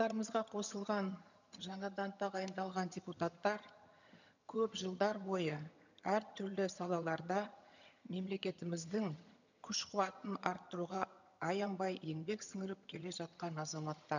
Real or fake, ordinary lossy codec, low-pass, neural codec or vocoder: fake; none; 7.2 kHz; vocoder, 22.05 kHz, 80 mel bands, HiFi-GAN